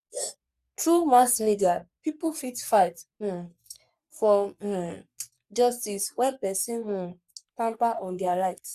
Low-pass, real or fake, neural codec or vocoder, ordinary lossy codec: 14.4 kHz; fake; codec, 44.1 kHz, 3.4 kbps, Pupu-Codec; Opus, 64 kbps